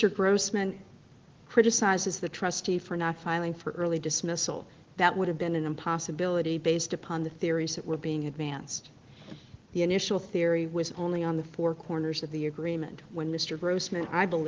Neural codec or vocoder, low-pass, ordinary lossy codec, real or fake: none; 7.2 kHz; Opus, 16 kbps; real